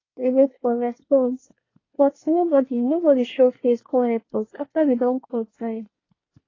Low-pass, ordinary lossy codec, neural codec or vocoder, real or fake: 7.2 kHz; AAC, 32 kbps; codec, 24 kHz, 1 kbps, SNAC; fake